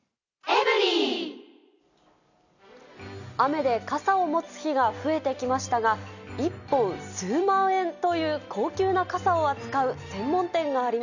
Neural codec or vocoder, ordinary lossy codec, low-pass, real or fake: none; none; 7.2 kHz; real